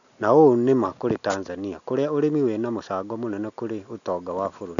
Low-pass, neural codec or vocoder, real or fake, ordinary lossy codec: 7.2 kHz; none; real; none